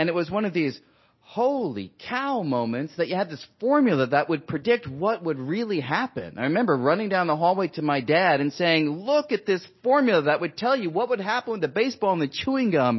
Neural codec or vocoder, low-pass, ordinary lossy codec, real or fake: none; 7.2 kHz; MP3, 24 kbps; real